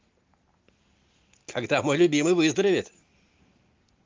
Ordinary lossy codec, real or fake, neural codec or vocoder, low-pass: Opus, 32 kbps; real; none; 7.2 kHz